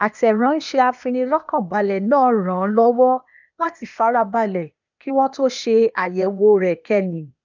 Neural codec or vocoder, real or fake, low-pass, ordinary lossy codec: codec, 16 kHz, 0.8 kbps, ZipCodec; fake; 7.2 kHz; none